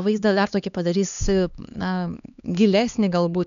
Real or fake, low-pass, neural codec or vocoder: fake; 7.2 kHz; codec, 16 kHz, 2 kbps, X-Codec, HuBERT features, trained on LibriSpeech